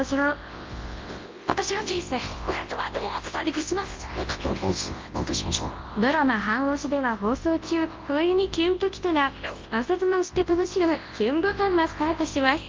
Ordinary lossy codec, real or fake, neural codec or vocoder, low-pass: Opus, 32 kbps; fake; codec, 24 kHz, 0.9 kbps, WavTokenizer, large speech release; 7.2 kHz